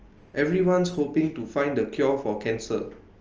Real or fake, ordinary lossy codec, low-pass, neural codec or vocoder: real; Opus, 24 kbps; 7.2 kHz; none